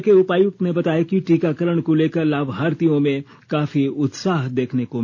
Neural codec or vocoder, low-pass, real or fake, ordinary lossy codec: none; none; real; none